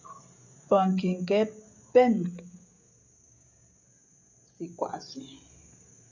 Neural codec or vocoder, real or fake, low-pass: codec, 16 kHz, 16 kbps, FreqCodec, smaller model; fake; 7.2 kHz